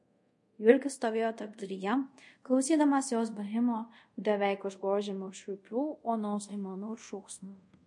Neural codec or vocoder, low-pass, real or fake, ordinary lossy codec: codec, 24 kHz, 0.5 kbps, DualCodec; 10.8 kHz; fake; MP3, 48 kbps